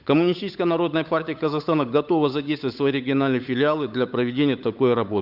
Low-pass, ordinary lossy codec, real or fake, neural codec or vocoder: 5.4 kHz; none; fake; codec, 16 kHz, 8 kbps, FunCodec, trained on Chinese and English, 25 frames a second